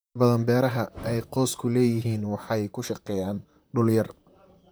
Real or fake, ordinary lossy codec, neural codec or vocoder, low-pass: fake; none; vocoder, 44.1 kHz, 128 mel bands, Pupu-Vocoder; none